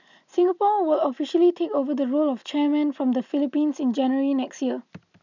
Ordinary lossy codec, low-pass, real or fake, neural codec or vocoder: none; 7.2 kHz; real; none